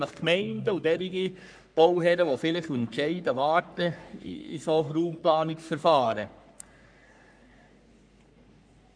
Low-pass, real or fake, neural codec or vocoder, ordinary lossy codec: 9.9 kHz; fake; codec, 44.1 kHz, 3.4 kbps, Pupu-Codec; none